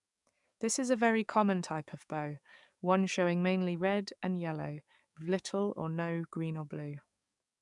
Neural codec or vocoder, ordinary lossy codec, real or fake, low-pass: codec, 44.1 kHz, 7.8 kbps, DAC; none; fake; 10.8 kHz